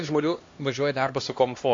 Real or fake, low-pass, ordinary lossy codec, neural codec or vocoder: fake; 7.2 kHz; AAC, 48 kbps; codec, 16 kHz, 1 kbps, X-Codec, HuBERT features, trained on LibriSpeech